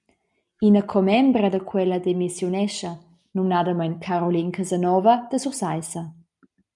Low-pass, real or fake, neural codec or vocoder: 10.8 kHz; real; none